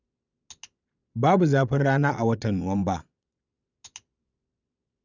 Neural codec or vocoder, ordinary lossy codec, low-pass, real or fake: vocoder, 22.05 kHz, 80 mel bands, Vocos; none; 7.2 kHz; fake